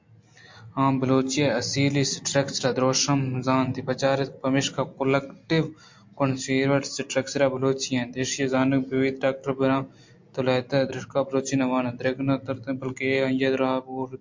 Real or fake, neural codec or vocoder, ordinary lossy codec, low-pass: real; none; MP3, 48 kbps; 7.2 kHz